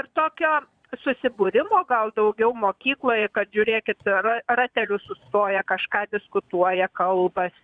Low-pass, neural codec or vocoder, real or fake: 9.9 kHz; vocoder, 22.05 kHz, 80 mel bands, Vocos; fake